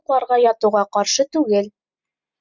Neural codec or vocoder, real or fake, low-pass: none; real; 7.2 kHz